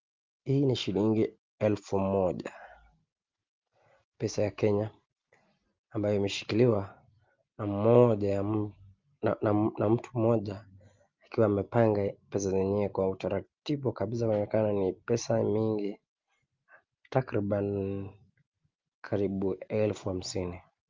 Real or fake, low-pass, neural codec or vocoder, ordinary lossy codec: real; 7.2 kHz; none; Opus, 32 kbps